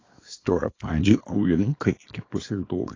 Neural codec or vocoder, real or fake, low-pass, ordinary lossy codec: codec, 24 kHz, 0.9 kbps, WavTokenizer, small release; fake; 7.2 kHz; AAC, 32 kbps